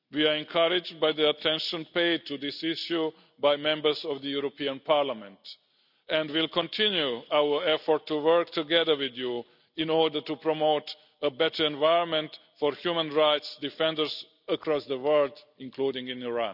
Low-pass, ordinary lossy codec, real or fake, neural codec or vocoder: 5.4 kHz; none; real; none